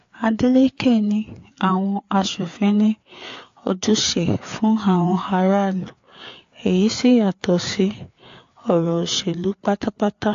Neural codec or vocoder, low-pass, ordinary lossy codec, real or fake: codec, 16 kHz, 4 kbps, FreqCodec, larger model; 7.2 kHz; AAC, 48 kbps; fake